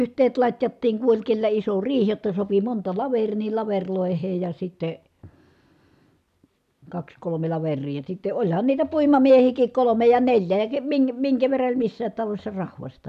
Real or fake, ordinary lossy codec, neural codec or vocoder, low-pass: real; none; none; 14.4 kHz